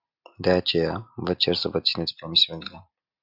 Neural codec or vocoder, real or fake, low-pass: none; real; 5.4 kHz